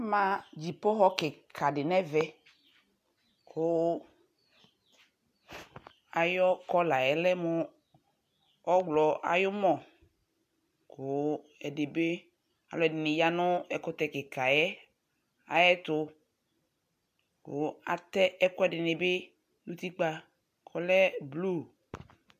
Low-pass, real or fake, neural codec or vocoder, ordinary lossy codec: 14.4 kHz; real; none; AAC, 96 kbps